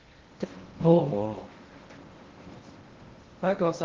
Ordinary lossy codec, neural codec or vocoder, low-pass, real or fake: Opus, 16 kbps; codec, 16 kHz in and 24 kHz out, 0.6 kbps, FocalCodec, streaming, 2048 codes; 7.2 kHz; fake